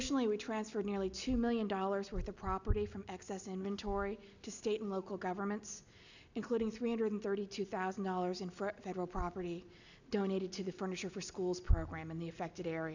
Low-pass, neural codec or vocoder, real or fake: 7.2 kHz; none; real